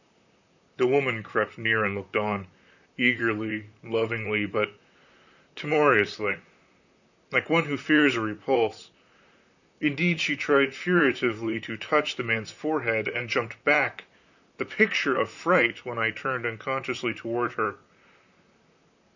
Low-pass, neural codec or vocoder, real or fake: 7.2 kHz; vocoder, 44.1 kHz, 128 mel bands, Pupu-Vocoder; fake